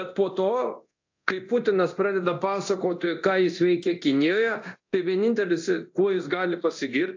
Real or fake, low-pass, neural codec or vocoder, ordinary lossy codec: fake; 7.2 kHz; codec, 24 kHz, 0.9 kbps, DualCodec; AAC, 48 kbps